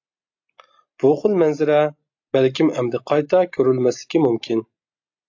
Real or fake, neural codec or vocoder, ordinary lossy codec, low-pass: real; none; AAC, 48 kbps; 7.2 kHz